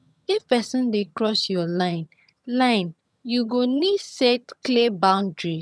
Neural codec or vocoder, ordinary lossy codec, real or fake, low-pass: vocoder, 22.05 kHz, 80 mel bands, HiFi-GAN; none; fake; none